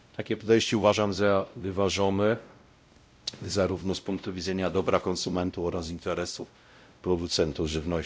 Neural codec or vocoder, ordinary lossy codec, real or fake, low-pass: codec, 16 kHz, 0.5 kbps, X-Codec, WavLM features, trained on Multilingual LibriSpeech; none; fake; none